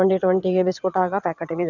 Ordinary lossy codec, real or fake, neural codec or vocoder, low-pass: none; fake; vocoder, 22.05 kHz, 80 mel bands, WaveNeXt; 7.2 kHz